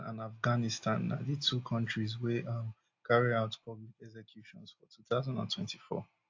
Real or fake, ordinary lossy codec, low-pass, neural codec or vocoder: real; AAC, 48 kbps; 7.2 kHz; none